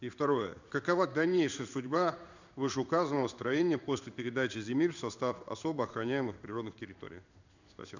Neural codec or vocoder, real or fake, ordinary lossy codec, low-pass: codec, 16 kHz in and 24 kHz out, 1 kbps, XY-Tokenizer; fake; none; 7.2 kHz